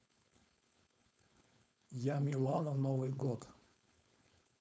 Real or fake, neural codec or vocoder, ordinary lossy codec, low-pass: fake; codec, 16 kHz, 4.8 kbps, FACodec; none; none